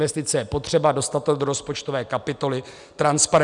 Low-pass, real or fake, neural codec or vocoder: 10.8 kHz; real; none